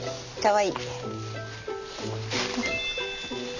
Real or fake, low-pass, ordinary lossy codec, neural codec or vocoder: real; 7.2 kHz; none; none